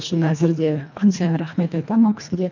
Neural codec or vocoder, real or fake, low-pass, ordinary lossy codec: codec, 24 kHz, 1.5 kbps, HILCodec; fake; 7.2 kHz; none